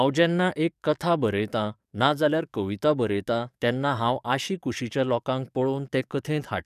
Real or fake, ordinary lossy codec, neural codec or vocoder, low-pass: fake; none; codec, 44.1 kHz, 7.8 kbps, DAC; 14.4 kHz